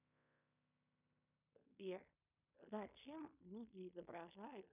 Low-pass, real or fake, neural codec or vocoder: 3.6 kHz; fake; codec, 16 kHz in and 24 kHz out, 0.9 kbps, LongCat-Audio-Codec, fine tuned four codebook decoder